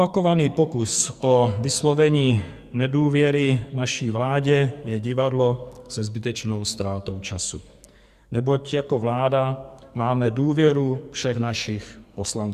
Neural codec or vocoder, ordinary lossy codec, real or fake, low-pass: codec, 44.1 kHz, 2.6 kbps, SNAC; Opus, 64 kbps; fake; 14.4 kHz